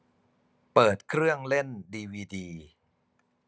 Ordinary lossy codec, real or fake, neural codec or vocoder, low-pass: none; real; none; none